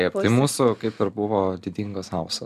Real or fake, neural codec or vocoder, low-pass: real; none; 14.4 kHz